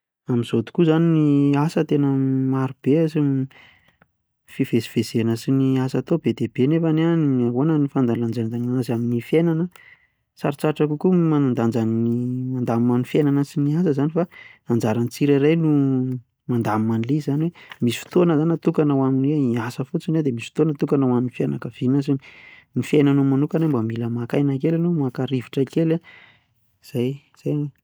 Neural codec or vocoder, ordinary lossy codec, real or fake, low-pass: none; none; real; none